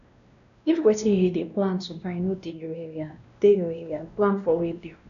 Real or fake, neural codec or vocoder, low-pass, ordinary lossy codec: fake; codec, 16 kHz, 1 kbps, X-Codec, WavLM features, trained on Multilingual LibriSpeech; 7.2 kHz; none